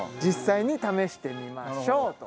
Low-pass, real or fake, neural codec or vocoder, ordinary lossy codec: none; real; none; none